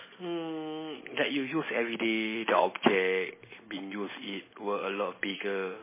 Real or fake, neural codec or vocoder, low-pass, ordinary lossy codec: real; none; 3.6 kHz; MP3, 16 kbps